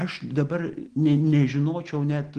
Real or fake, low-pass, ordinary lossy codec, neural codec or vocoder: real; 9.9 kHz; Opus, 16 kbps; none